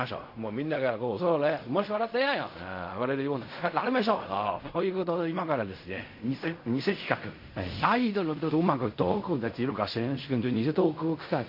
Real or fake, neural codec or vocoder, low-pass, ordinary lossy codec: fake; codec, 16 kHz in and 24 kHz out, 0.4 kbps, LongCat-Audio-Codec, fine tuned four codebook decoder; 5.4 kHz; MP3, 32 kbps